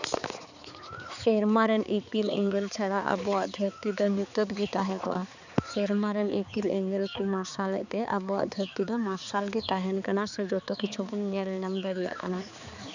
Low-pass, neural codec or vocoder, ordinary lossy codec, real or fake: 7.2 kHz; codec, 16 kHz, 4 kbps, X-Codec, HuBERT features, trained on balanced general audio; none; fake